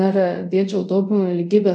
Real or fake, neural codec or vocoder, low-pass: fake; codec, 24 kHz, 0.5 kbps, DualCodec; 9.9 kHz